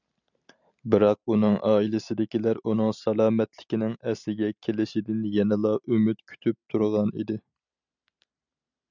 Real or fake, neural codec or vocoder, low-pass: real; none; 7.2 kHz